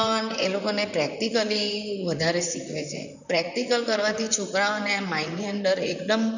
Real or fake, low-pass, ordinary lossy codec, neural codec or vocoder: fake; 7.2 kHz; none; vocoder, 44.1 kHz, 128 mel bands, Pupu-Vocoder